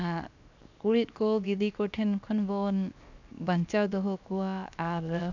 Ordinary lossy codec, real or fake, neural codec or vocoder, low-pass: none; fake; codec, 16 kHz, 0.7 kbps, FocalCodec; 7.2 kHz